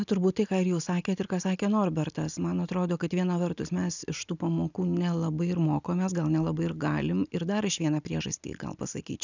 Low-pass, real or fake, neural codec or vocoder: 7.2 kHz; real; none